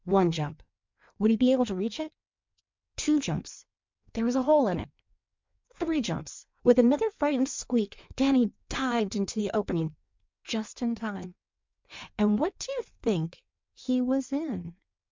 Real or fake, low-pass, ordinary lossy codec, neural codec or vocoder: fake; 7.2 kHz; MP3, 64 kbps; codec, 16 kHz in and 24 kHz out, 1.1 kbps, FireRedTTS-2 codec